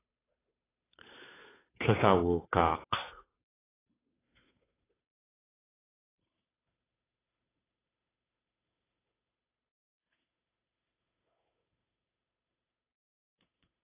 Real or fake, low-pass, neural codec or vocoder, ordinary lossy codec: fake; 3.6 kHz; codec, 16 kHz, 2 kbps, FunCodec, trained on Chinese and English, 25 frames a second; AAC, 16 kbps